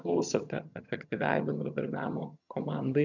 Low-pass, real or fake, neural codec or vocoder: 7.2 kHz; fake; vocoder, 22.05 kHz, 80 mel bands, HiFi-GAN